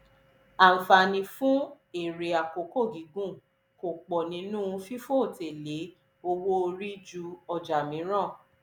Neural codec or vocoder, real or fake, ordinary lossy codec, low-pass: none; real; none; none